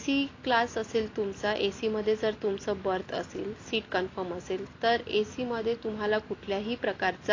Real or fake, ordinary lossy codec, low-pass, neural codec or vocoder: real; AAC, 32 kbps; 7.2 kHz; none